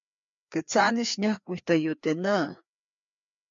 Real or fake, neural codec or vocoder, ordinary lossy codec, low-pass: fake; codec, 16 kHz, 2 kbps, FreqCodec, larger model; MP3, 64 kbps; 7.2 kHz